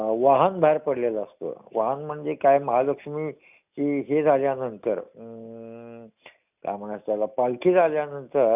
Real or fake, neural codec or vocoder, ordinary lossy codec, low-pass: real; none; none; 3.6 kHz